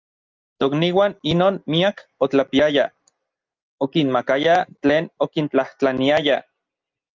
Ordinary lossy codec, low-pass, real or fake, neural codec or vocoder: Opus, 24 kbps; 7.2 kHz; real; none